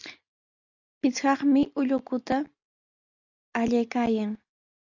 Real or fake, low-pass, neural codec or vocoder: real; 7.2 kHz; none